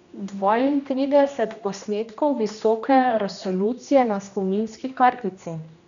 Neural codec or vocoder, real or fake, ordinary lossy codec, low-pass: codec, 16 kHz, 1 kbps, X-Codec, HuBERT features, trained on general audio; fake; none; 7.2 kHz